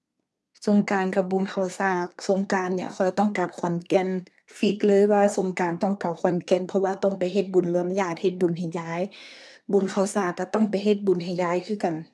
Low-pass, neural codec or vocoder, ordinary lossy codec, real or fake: none; codec, 24 kHz, 1 kbps, SNAC; none; fake